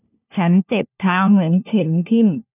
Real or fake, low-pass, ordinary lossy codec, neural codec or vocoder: fake; 3.6 kHz; none; codec, 16 kHz, 1 kbps, FunCodec, trained on LibriTTS, 50 frames a second